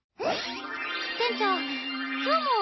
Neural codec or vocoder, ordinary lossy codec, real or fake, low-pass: none; MP3, 24 kbps; real; 7.2 kHz